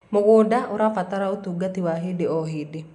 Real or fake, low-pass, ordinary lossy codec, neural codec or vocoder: real; 10.8 kHz; none; none